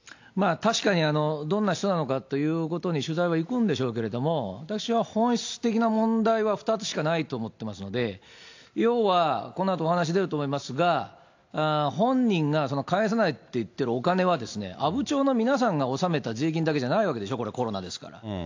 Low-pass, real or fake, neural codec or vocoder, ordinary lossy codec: 7.2 kHz; real; none; AAC, 48 kbps